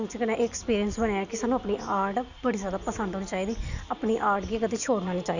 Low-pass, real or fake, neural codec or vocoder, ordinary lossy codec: 7.2 kHz; real; none; none